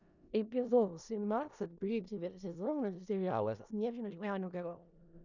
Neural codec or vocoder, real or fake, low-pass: codec, 16 kHz in and 24 kHz out, 0.4 kbps, LongCat-Audio-Codec, four codebook decoder; fake; 7.2 kHz